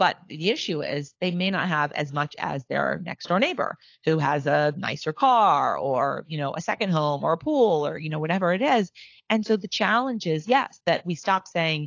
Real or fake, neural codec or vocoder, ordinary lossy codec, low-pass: fake; codec, 16 kHz, 4 kbps, FunCodec, trained on LibriTTS, 50 frames a second; AAC, 48 kbps; 7.2 kHz